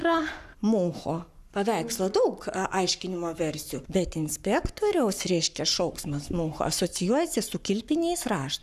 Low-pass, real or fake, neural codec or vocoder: 14.4 kHz; fake; codec, 44.1 kHz, 7.8 kbps, Pupu-Codec